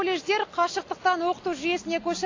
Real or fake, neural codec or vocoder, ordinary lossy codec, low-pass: real; none; AAC, 32 kbps; 7.2 kHz